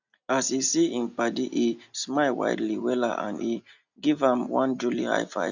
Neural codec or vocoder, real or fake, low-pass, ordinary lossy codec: none; real; 7.2 kHz; none